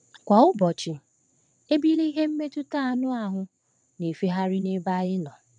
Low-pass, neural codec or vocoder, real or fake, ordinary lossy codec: 9.9 kHz; vocoder, 22.05 kHz, 80 mel bands, WaveNeXt; fake; none